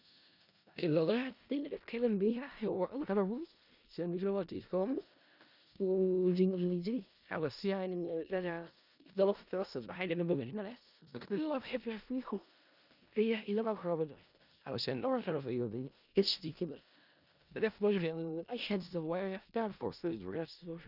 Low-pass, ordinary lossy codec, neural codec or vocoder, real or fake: 5.4 kHz; none; codec, 16 kHz in and 24 kHz out, 0.4 kbps, LongCat-Audio-Codec, four codebook decoder; fake